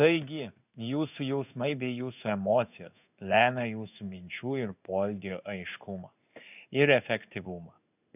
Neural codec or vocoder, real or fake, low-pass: codec, 16 kHz in and 24 kHz out, 1 kbps, XY-Tokenizer; fake; 3.6 kHz